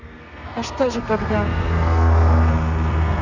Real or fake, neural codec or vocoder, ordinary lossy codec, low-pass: fake; codec, 32 kHz, 1.9 kbps, SNAC; none; 7.2 kHz